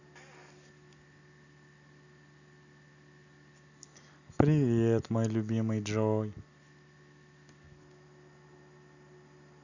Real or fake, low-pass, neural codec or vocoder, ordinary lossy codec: real; 7.2 kHz; none; none